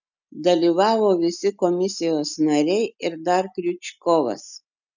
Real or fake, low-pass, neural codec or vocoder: real; 7.2 kHz; none